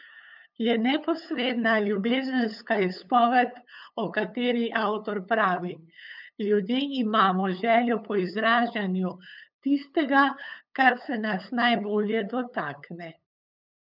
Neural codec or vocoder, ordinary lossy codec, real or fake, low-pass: codec, 16 kHz, 8 kbps, FunCodec, trained on LibriTTS, 25 frames a second; none; fake; 5.4 kHz